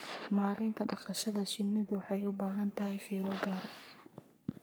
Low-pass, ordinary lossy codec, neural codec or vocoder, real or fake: none; none; codec, 44.1 kHz, 3.4 kbps, Pupu-Codec; fake